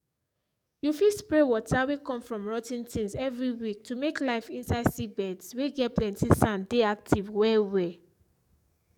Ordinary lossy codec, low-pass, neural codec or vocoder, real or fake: none; 19.8 kHz; codec, 44.1 kHz, 7.8 kbps, DAC; fake